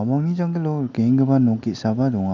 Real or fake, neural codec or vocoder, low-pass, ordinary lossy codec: real; none; 7.2 kHz; none